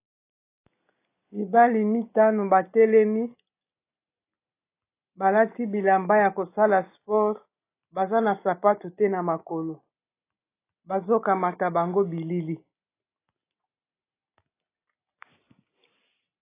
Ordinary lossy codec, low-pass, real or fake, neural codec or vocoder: AAC, 24 kbps; 3.6 kHz; real; none